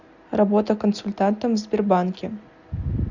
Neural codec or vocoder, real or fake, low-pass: none; real; 7.2 kHz